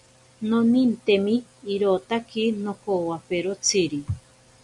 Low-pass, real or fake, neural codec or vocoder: 10.8 kHz; real; none